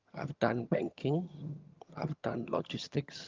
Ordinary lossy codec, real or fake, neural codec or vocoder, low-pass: Opus, 32 kbps; fake; vocoder, 22.05 kHz, 80 mel bands, HiFi-GAN; 7.2 kHz